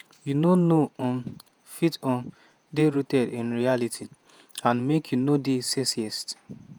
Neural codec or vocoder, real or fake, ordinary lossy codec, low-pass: vocoder, 48 kHz, 128 mel bands, Vocos; fake; none; none